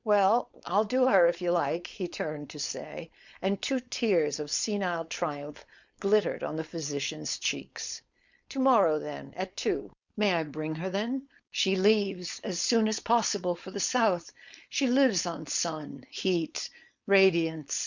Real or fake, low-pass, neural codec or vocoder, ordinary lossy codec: fake; 7.2 kHz; codec, 16 kHz, 4.8 kbps, FACodec; Opus, 64 kbps